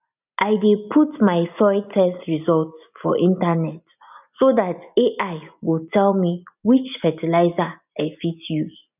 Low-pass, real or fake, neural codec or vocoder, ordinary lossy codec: 3.6 kHz; real; none; none